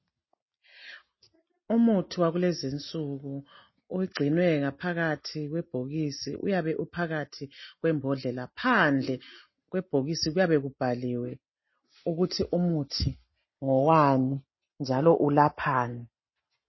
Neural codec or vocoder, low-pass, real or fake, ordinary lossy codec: none; 7.2 kHz; real; MP3, 24 kbps